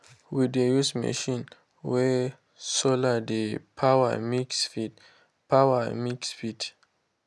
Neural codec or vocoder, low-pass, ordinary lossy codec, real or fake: none; none; none; real